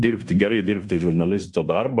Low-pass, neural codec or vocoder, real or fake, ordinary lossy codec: 10.8 kHz; codec, 16 kHz in and 24 kHz out, 0.9 kbps, LongCat-Audio-Codec, fine tuned four codebook decoder; fake; MP3, 96 kbps